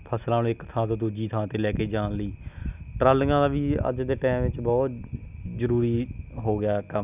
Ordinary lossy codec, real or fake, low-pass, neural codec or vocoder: none; real; 3.6 kHz; none